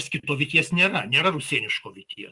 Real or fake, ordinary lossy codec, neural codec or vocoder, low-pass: real; Opus, 64 kbps; none; 10.8 kHz